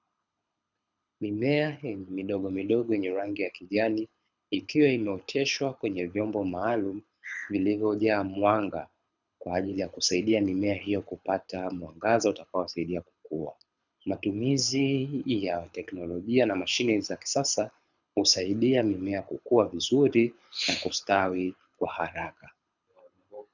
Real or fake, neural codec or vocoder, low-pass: fake; codec, 24 kHz, 6 kbps, HILCodec; 7.2 kHz